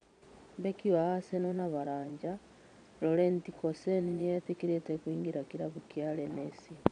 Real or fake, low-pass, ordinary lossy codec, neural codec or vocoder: fake; 9.9 kHz; none; vocoder, 22.05 kHz, 80 mel bands, Vocos